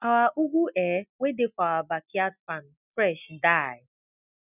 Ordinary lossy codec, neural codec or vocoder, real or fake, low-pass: none; none; real; 3.6 kHz